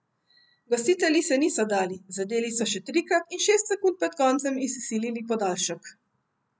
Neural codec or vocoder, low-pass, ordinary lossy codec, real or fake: none; none; none; real